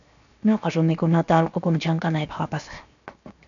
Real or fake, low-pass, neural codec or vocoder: fake; 7.2 kHz; codec, 16 kHz, 0.7 kbps, FocalCodec